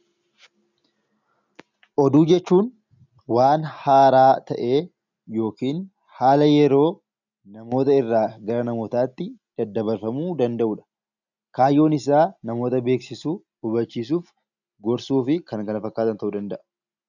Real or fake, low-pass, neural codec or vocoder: real; 7.2 kHz; none